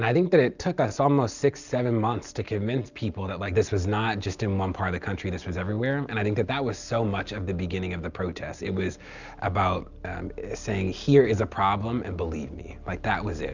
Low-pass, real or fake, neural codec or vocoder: 7.2 kHz; real; none